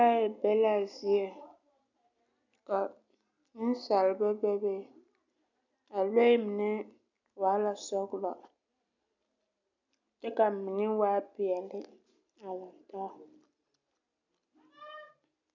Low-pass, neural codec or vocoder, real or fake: 7.2 kHz; none; real